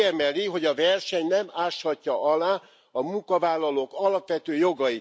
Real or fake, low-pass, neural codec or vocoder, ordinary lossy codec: real; none; none; none